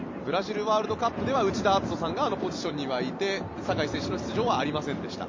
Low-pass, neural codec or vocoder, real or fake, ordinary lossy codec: 7.2 kHz; vocoder, 44.1 kHz, 128 mel bands every 256 samples, BigVGAN v2; fake; MP3, 48 kbps